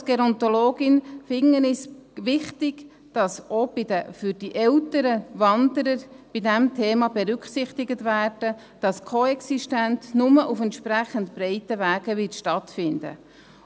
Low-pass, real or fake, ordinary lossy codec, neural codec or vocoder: none; real; none; none